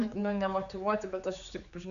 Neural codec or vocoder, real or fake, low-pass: codec, 16 kHz, 4 kbps, X-Codec, HuBERT features, trained on general audio; fake; 7.2 kHz